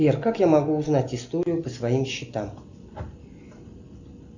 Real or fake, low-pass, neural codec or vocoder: real; 7.2 kHz; none